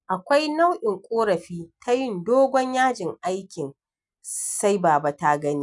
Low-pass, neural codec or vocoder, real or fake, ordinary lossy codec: 10.8 kHz; none; real; none